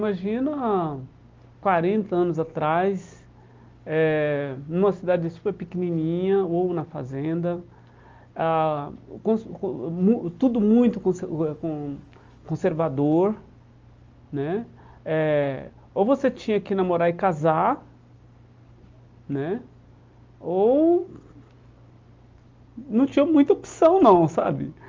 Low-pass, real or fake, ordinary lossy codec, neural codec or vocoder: 7.2 kHz; real; Opus, 32 kbps; none